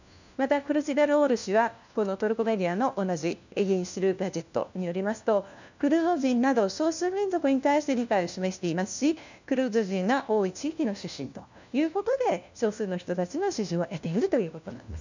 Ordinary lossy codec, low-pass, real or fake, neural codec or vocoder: none; 7.2 kHz; fake; codec, 16 kHz, 1 kbps, FunCodec, trained on LibriTTS, 50 frames a second